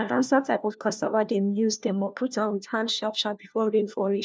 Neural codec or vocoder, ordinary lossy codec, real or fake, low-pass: codec, 16 kHz, 1 kbps, FunCodec, trained on LibriTTS, 50 frames a second; none; fake; none